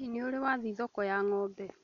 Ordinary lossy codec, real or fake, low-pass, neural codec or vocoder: none; real; 7.2 kHz; none